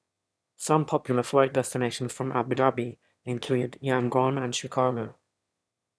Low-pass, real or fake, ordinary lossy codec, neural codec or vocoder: none; fake; none; autoencoder, 22.05 kHz, a latent of 192 numbers a frame, VITS, trained on one speaker